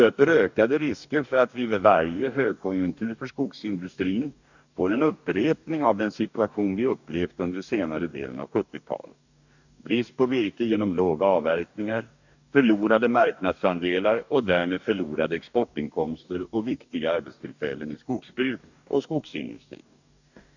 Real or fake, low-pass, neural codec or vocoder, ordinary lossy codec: fake; 7.2 kHz; codec, 44.1 kHz, 2.6 kbps, DAC; none